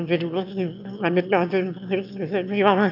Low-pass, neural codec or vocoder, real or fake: 5.4 kHz; autoencoder, 22.05 kHz, a latent of 192 numbers a frame, VITS, trained on one speaker; fake